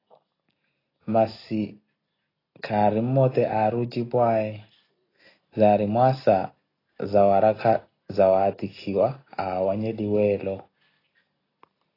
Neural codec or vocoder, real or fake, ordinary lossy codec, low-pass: none; real; AAC, 24 kbps; 5.4 kHz